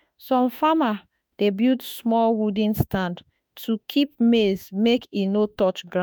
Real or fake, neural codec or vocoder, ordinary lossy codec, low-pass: fake; autoencoder, 48 kHz, 32 numbers a frame, DAC-VAE, trained on Japanese speech; none; none